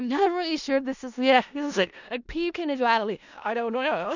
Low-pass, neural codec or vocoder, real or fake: 7.2 kHz; codec, 16 kHz in and 24 kHz out, 0.4 kbps, LongCat-Audio-Codec, four codebook decoder; fake